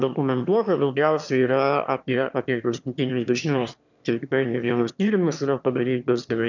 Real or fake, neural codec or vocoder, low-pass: fake; autoencoder, 22.05 kHz, a latent of 192 numbers a frame, VITS, trained on one speaker; 7.2 kHz